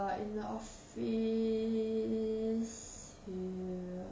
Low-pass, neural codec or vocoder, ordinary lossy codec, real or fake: none; none; none; real